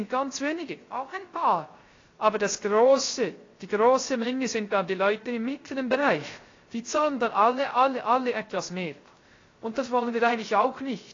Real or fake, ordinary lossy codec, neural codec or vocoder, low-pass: fake; AAC, 32 kbps; codec, 16 kHz, 0.3 kbps, FocalCodec; 7.2 kHz